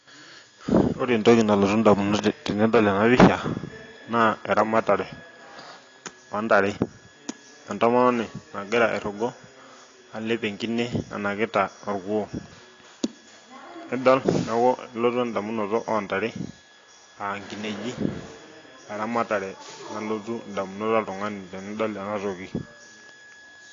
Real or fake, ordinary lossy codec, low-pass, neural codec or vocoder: real; AAC, 32 kbps; 7.2 kHz; none